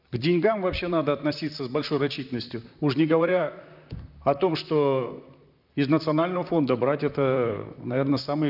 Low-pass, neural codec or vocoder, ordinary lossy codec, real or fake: 5.4 kHz; vocoder, 44.1 kHz, 128 mel bands, Pupu-Vocoder; none; fake